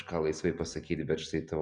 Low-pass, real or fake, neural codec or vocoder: 9.9 kHz; real; none